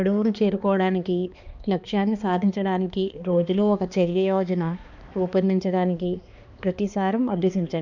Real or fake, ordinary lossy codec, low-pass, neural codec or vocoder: fake; none; 7.2 kHz; codec, 16 kHz, 2 kbps, X-Codec, HuBERT features, trained on balanced general audio